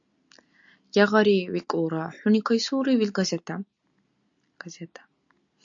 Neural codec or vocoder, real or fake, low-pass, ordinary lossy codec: none; real; 7.2 kHz; AAC, 64 kbps